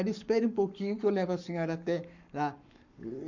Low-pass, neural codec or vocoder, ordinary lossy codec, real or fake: 7.2 kHz; codec, 44.1 kHz, 7.8 kbps, Pupu-Codec; none; fake